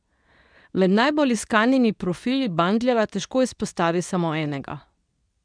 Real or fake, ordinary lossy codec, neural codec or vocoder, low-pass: fake; none; codec, 24 kHz, 0.9 kbps, WavTokenizer, medium speech release version 2; 9.9 kHz